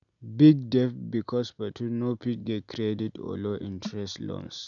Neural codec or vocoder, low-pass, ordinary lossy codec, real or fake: none; 7.2 kHz; MP3, 96 kbps; real